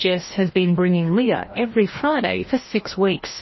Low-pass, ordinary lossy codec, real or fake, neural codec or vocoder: 7.2 kHz; MP3, 24 kbps; fake; codec, 16 kHz, 1 kbps, FreqCodec, larger model